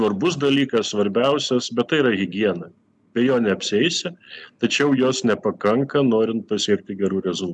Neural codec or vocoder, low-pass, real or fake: none; 10.8 kHz; real